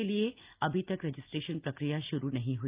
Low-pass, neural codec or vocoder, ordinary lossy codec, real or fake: 3.6 kHz; none; Opus, 32 kbps; real